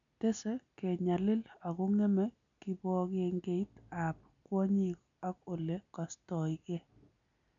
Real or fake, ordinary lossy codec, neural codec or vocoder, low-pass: real; none; none; 7.2 kHz